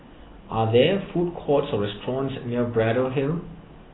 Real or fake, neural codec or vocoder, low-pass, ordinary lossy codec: real; none; 7.2 kHz; AAC, 16 kbps